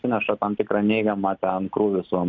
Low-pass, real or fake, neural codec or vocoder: 7.2 kHz; real; none